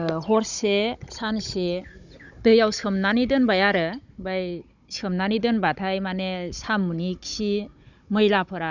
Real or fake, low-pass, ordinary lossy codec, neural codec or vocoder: fake; 7.2 kHz; Opus, 64 kbps; codec, 16 kHz, 16 kbps, FunCodec, trained on Chinese and English, 50 frames a second